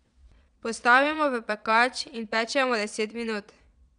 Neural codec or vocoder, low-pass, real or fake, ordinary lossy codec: vocoder, 22.05 kHz, 80 mel bands, Vocos; 9.9 kHz; fake; none